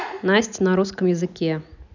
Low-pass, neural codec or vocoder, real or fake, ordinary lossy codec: 7.2 kHz; none; real; none